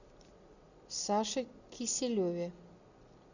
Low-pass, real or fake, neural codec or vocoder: 7.2 kHz; real; none